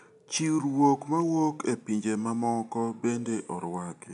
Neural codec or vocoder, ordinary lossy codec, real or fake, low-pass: none; none; real; 10.8 kHz